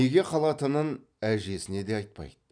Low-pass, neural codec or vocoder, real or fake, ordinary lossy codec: 9.9 kHz; none; real; none